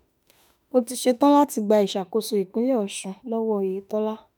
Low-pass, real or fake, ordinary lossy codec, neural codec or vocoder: none; fake; none; autoencoder, 48 kHz, 32 numbers a frame, DAC-VAE, trained on Japanese speech